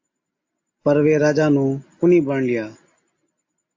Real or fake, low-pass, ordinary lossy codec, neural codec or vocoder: real; 7.2 kHz; AAC, 48 kbps; none